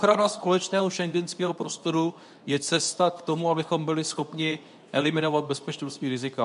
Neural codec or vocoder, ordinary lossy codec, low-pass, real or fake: codec, 24 kHz, 0.9 kbps, WavTokenizer, medium speech release version 2; AAC, 96 kbps; 10.8 kHz; fake